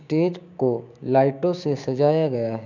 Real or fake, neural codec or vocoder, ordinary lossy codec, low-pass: fake; codec, 16 kHz, 6 kbps, DAC; none; 7.2 kHz